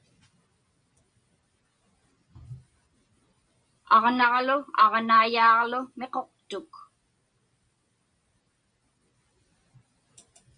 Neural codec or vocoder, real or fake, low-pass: none; real; 9.9 kHz